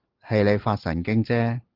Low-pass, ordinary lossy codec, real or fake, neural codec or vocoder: 5.4 kHz; Opus, 16 kbps; real; none